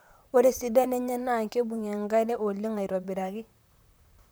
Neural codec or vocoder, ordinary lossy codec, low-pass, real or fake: vocoder, 44.1 kHz, 128 mel bands, Pupu-Vocoder; none; none; fake